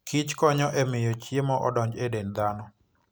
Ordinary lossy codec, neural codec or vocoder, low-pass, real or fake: none; none; none; real